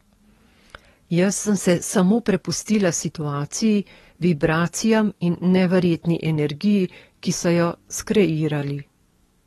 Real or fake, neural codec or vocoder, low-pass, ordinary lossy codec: fake; codec, 44.1 kHz, 7.8 kbps, DAC; 19.8 kHz; AAC, 32 kbps